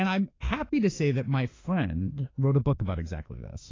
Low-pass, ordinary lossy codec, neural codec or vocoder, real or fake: 7.2 kHz; AAC, 32 kbps; autoencoder, 48 kHz, 32 numbers a frame, DAC-VAE, trained on Japanese speech; fake